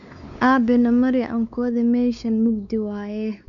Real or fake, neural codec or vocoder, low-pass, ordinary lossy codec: fake; codec, 16 kHz, 4 kbps, X-Codec, WavLM features, trained on Multilingual LibriSpeech; 7.2 kHz; none